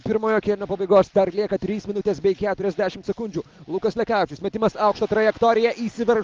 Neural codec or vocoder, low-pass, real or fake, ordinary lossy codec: none; 7.2 kHz; real; Opus, 32 kbps